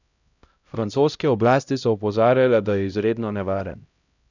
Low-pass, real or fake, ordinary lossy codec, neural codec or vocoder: 7.2 kHz; fake; none; codec, 16 kHz, 0.5 kbps, X-Codec, HuBERT features, trained on LibriSpeech